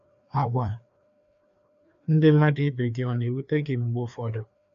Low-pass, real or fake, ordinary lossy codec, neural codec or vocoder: 7.2 kHz; fake; none; codec, 16 kHz, 2 kbps, FreqCodec, larger model